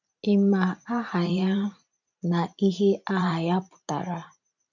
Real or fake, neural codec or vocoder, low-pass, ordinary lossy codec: fake; vocoder, 44.1 kHz, 128 mel bands every 512 samples, BigVGAN v2; 7.2 kHz; none